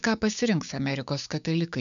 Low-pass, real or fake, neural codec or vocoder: 7.2 kHz; fake; codec, 16 kHz, 6 kbps, DAC